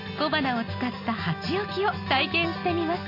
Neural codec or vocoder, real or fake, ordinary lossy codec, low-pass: none; real; AAC, 32 kbps; 5.4 kHz